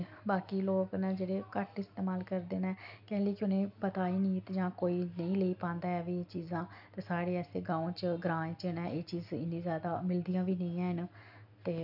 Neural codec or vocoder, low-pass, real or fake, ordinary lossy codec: none; 5.4 kHz; real; none